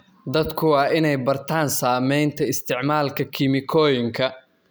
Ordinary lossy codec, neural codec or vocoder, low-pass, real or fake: none; none; none; real